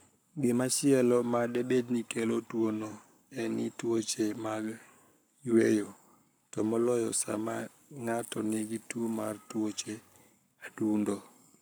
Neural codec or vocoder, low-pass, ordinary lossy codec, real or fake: codec, 44.1 kHz, 7.8 kbps, Pupu-Codec; none; none; fake